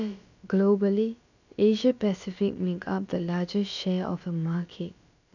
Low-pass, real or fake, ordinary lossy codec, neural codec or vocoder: 7.2 kHz; fake; none; codec, 16 kHz, about 1 kbps, DyCAST, with the encoder's durations